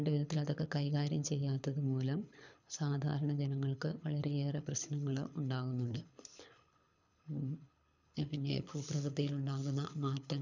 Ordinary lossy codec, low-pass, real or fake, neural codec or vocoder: none; 7.2 kHz; fake; codec, 24 kHz, 6 kbps, HILCodec